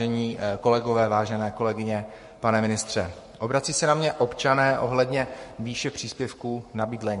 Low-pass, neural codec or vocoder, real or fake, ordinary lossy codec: 14.4 kHz; codec, 44.1 kHz, 7.8 kbps, Pupu-Codec; fake; MP3, 48 kbps